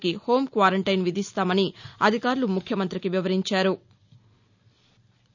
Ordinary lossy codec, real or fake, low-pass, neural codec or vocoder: none; real; 7.2 kHz; none